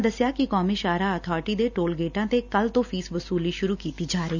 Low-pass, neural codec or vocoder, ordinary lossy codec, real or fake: 7.2 kHz; none; none; real